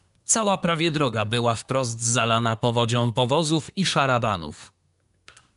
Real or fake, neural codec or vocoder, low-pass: fake; codec, 24 kHz, 1 kbps, SNAC; 10.8 kHz